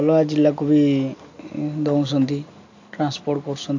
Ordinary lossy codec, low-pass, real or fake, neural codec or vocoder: AAC, 48 kbps; 7.2 kHz; real; none